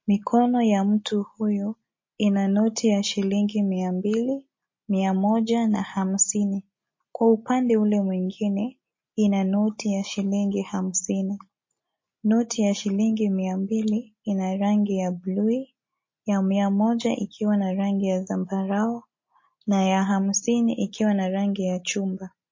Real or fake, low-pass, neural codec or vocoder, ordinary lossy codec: real; 7.2 kHz; none; MP3, 32 kbps